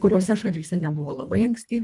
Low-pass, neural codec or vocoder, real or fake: 10.8 kHz; codec, 24 kHz, 1.5 kbps, HILCodec; fake